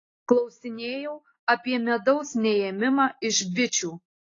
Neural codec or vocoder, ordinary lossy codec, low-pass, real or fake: none; AAC, 32 kbps; 7.2 kHz; real